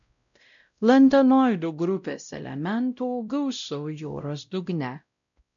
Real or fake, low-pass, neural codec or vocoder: fake; 7.2 kHz; codec, 16 kHz, 0.5 kbps, X-Codec, WavLM features, trained on Multilingual LibriSpeech